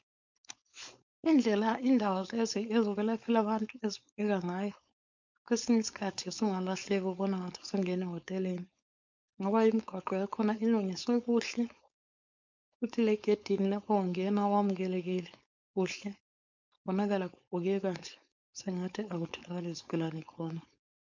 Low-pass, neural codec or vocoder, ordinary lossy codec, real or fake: 7.2 kHz; codec, 16 kHz, 4.8 kbps, FACodec; MP3, 64 kbps; fake